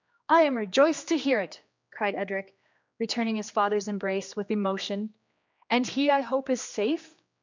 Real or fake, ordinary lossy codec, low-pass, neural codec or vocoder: fake; MP3, 64 kbps; 7.2 kHz; codec, 16 kHz, 2 kbps, X-Codec, HuBERT features, trained on general audio